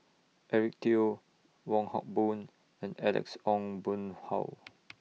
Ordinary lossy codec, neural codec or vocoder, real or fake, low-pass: none; none; real; none